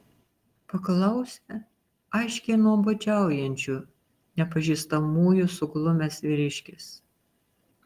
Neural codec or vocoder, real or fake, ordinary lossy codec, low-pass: none; real; Opus, 24 kbps; 14.4 kHz